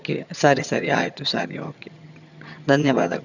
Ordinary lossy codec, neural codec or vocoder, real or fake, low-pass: none; vocoder, 22.05 kHz, 80 mel bands, HiFi-GAN; fake; 7.2 kHz